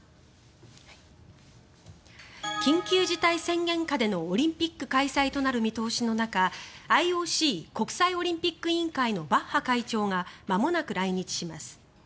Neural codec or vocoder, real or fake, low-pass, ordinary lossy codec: none; real; none; none